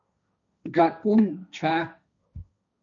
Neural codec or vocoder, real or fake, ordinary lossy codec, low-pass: codec, 16 kHz, 1.1 kbps, Voila-Tokenizer; fake; MP3, 64 kbps; 7.2 kHz